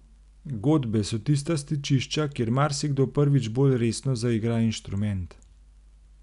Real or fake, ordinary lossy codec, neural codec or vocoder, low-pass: real; none; none; 10.8 kHz